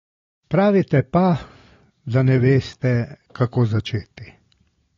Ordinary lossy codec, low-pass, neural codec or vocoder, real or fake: AAC, 32 kbps; 7.2 kHz; none; real